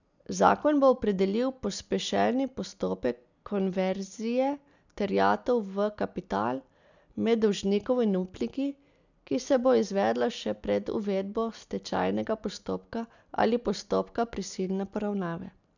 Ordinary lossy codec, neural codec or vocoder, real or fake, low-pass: none; none; real; 7.2 kHz